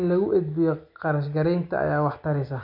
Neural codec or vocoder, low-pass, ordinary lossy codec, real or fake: none; 5.4 kHz; none; real